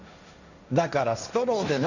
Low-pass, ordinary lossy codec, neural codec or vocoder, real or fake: 7.2 kHz; none; codec, 16 kHz, 1.1 kbps, Voila-Tokenizer; fake